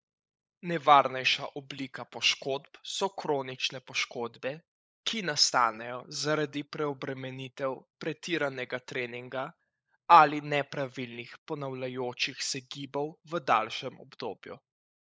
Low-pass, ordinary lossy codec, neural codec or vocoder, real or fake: none; none; codec, 16 kHz, 16 kbps, FunCodec, trained on LibriTTS, 50 frames a second; fake